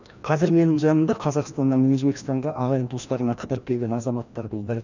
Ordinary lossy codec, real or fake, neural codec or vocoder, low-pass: none; fake; codec, 16 kHz, 1 kbps, FreqCodec, larger model; 7.2 kHz